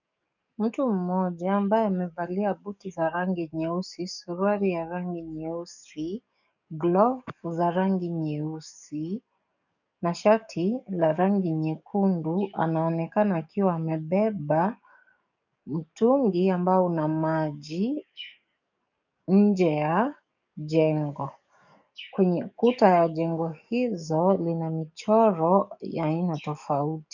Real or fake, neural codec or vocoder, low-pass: fake; codec, 44.1 kHz, 7.8 kbps, DAC; 7.2 kHz